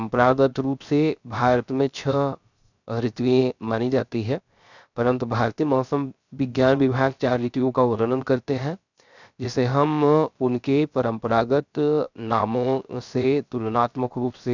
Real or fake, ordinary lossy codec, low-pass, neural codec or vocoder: fake; none; 7.2 kHz; codec, 16 kHz, 0.3 kbps, FocalCodec